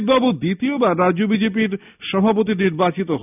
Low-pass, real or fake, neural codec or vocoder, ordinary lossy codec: 3.6 kHz; real; none; none